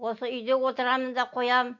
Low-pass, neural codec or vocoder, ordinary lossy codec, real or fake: 7.2 kHz; none; none; real